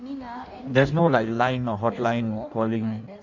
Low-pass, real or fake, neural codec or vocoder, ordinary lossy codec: 7.2 kHz; fake; codec, 16 kHz in and 24 kHz out, 1.1 kbps, FireRedTTS-2 codec; none